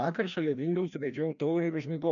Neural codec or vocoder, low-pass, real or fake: codec, 16 kHz, 1 kbps, FreqCodec, larger model; 7.2 kHz; fake